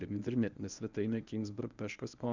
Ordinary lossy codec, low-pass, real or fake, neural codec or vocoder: Opus, 64 kbps; 7.2 kHz; fake; codec, 24 kHz, 0.9 kbps, WavTokenizer, medium speech release version 1